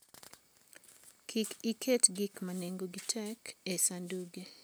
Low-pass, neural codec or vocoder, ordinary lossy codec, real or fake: none; none; none; real